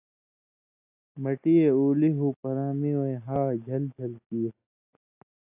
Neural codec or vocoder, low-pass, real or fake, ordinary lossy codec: none; 3.6 kHz; real; AAC, 32 kbps